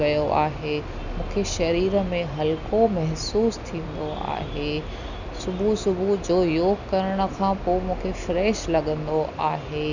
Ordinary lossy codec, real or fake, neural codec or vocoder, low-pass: none; real; none; 7.2 kHz